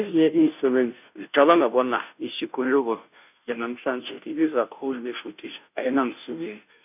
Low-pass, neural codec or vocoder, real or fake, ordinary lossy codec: 3.6 kHz; codec, 16 kHz, 0.5 kbps, FunCodec, trained on Chinese and English, 25 frames a second; fake; none